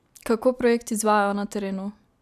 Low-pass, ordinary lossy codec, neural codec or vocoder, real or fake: 14.4 kHz; none; none; real